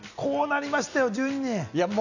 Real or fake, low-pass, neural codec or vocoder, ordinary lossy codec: real; 7.2 kHz; none; none